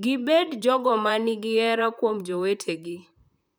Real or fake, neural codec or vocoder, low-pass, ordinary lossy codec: fake; vocoder, 44.1 kHz, 128 mel bands, Pupu-Vocoder; none; none